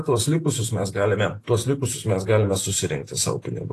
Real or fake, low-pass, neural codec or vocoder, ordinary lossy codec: fake; 14.4 kHz; vocoder, 48 kHz, 128 mel bands, Vocos; AAC, 48 kbps